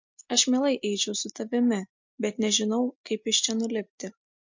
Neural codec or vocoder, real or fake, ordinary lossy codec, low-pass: none; real; MP3, 48 kbps; 7.2 kHz